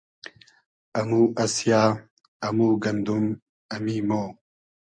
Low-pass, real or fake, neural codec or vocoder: 9.9 kHz; real; none